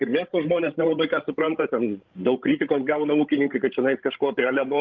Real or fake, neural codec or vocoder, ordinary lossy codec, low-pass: fake; codec, 16 kHz, 16 kbps, FreqCodec, larger model; Opus, 24 kbps; 7.2 kHz